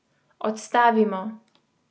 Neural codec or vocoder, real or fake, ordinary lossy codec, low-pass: none; real; none; none